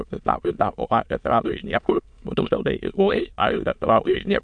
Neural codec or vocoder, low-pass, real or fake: autoencoder, 22.05 kHz, a latent of 192 numbers a frame, VITS, trained on many speakers; 9.9 kHz; fake